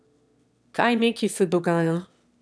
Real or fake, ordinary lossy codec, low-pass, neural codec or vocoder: fake; none; none; autoencoder, 22.05 kHz, a latent of 192 numbers a frame, VITS, trained on one speaker